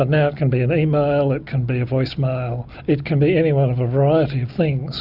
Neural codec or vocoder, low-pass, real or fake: none; 5.4 kHz; real